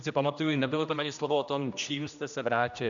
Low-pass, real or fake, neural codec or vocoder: 7.2 kHz; fake; codec, 16 kHz, 1 kbps, X-Codec, HuBERT features, trained on general audio